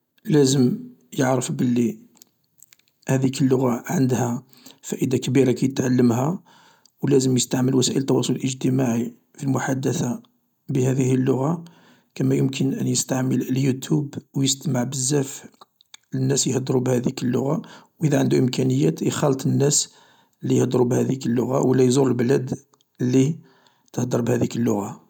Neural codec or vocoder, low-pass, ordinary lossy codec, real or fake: none; 19.8 kHz; none; real